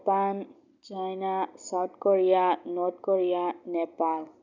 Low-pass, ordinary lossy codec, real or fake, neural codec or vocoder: 7.2 kHz; none; real; none